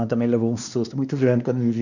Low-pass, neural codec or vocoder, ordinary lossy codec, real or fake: 7.2 kHz; codec, 16 kHz, 2 kbps, X-Codec, WavLM features, trained on Multilingual LibriSpeech; none; fake